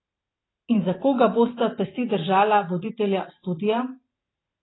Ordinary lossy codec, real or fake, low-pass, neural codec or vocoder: AAC, 16 kbps; fake; 7.2 kHz; vocoder, 44.1 kHz, 128 mel bands every 512 samples, BigVGAN v2